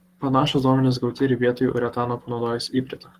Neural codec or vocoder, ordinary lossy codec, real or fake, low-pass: codec, 44.1 kHz, 7.8 kbps, Pupu-Codec; Opus, 24 kbps; fake; 14.4 kHz